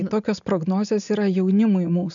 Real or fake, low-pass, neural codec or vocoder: real; 7.2 kHz; none